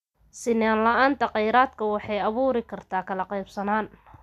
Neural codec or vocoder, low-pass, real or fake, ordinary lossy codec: none; 14.4 kHz; real; none